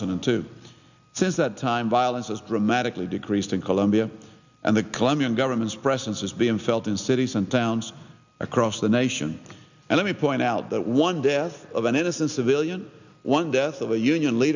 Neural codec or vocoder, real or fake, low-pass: none; real; 7.2 kHz